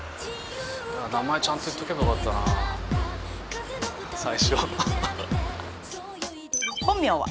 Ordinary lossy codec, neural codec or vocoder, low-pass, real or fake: none; none; none; real